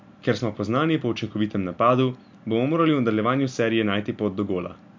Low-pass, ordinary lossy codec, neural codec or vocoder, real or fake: 7.2 kHz; MP3, 64 kbps; none; real